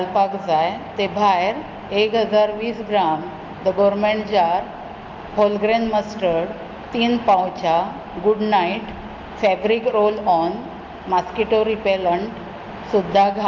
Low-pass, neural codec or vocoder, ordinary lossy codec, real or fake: 7.2 kHz; none; Opus, 24 kbps; real